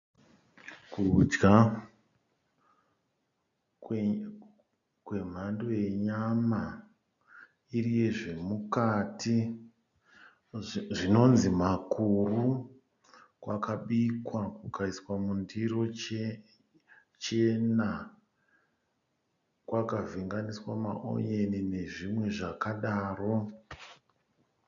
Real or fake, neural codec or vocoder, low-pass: real; none; 7.2 kHz